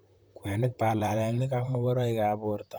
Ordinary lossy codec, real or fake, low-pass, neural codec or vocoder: none; fake; none; vocoder, 44.1 kHz, 128 mel bands, Pupu-Vocoder